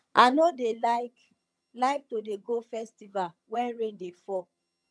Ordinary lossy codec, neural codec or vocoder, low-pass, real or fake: none; vocoder, 22.05 kHz, 80 mel bands, HiFi-GAN; none; fake